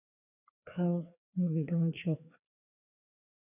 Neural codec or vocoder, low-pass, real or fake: codec, 16 kHz, 2 kbps, FreqCodec, larger model; 3.6 kHz; fake